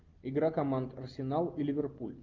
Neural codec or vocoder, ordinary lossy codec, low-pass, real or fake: none; Opus, 32 kbps; 7.2 kHz; real